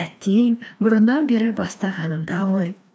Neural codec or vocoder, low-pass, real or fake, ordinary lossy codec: codec, 16 kHz, 1 kbps, FreqCodec, larger model; none; fake; none